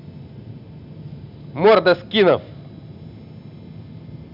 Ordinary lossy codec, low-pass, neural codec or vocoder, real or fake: none; 5.4 kHz; none; real